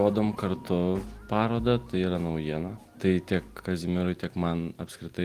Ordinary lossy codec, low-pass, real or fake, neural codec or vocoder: Opus, 24 kbps; 14.4 kHz; real; none